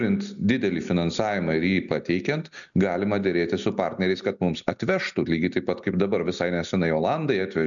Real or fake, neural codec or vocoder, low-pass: real; none; 7.2 kHz